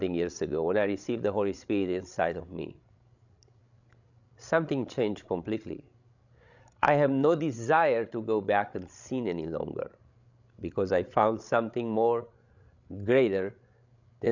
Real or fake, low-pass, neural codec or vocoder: fake; 7.2 kHz; codec, 16 kHz, 16 kbps, FreqCodec, larger model